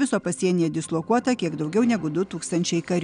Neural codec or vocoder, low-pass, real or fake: none; 9.9 kHz; real